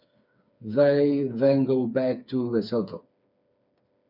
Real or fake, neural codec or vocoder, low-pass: fake; codec, 16 kHz, 4 kbps, FreqCodec, smaller model; 5.4 kHz